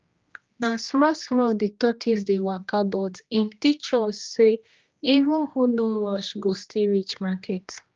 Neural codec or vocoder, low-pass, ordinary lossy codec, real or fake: codec, 16 kHz, 1 kbps, X-Codec, HuBERT features, trained on general audio; 7.2 kHz; Opus, 24 kbps; fake